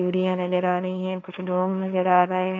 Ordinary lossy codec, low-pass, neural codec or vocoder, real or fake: none; none; codec, 16 kHz, 1.1 kbps, Voila-Tokenizer; fake